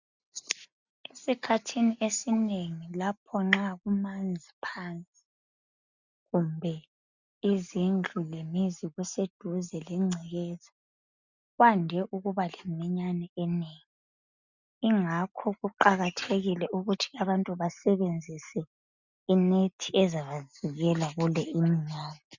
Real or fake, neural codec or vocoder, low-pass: real; none; 7.2 kHz